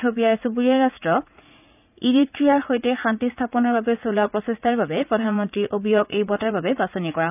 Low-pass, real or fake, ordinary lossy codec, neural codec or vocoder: 3.6 kHz; fake; none; vocoder, 44.1 kHz, 80 mel bands, Vocos